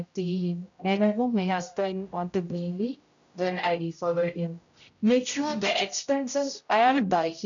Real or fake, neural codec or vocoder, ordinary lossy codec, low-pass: fake; codec, 16 kHz, 0.5 kbps, X-Codec, HuBERT features, trained on general audio; none; 7.2 kHz